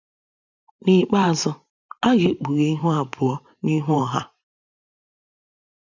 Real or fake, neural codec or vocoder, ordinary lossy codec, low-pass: fake; vocoder, 44.1 kHz, 128 mel bands every 512 samples, BigVGAN v2; none; 7.2 kHz